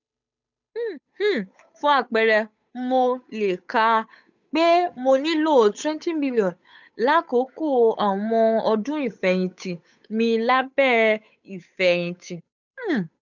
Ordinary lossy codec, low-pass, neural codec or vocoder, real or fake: none; 7.2 kHz; codec, 16 kHz, 8 kbps, FunCodec, trained on Chinese and English, 25 frames a second; fake